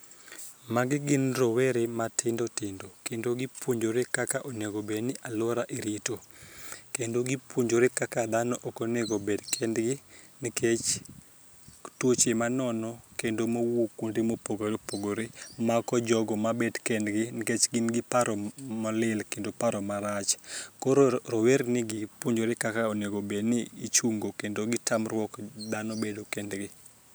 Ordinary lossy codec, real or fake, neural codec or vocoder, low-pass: none; real; none; none